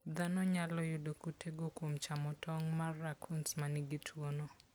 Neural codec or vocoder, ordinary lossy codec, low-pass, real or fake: none; none; none; real